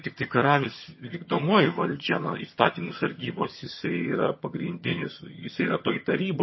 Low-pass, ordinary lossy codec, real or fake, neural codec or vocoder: 7.2 kHz; MP3, 24 kbps; fake; vocoder, 22.05 kHz, 80 mel bands, HiFi-GAN